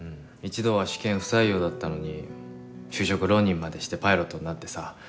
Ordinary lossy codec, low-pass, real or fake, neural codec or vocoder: none; none; real; none